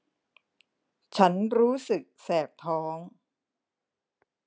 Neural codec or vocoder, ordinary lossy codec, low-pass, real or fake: none; none; none; real